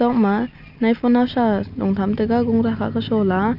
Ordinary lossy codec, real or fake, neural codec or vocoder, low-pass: Opus, 64 kbps; real; none; 5.4 kHz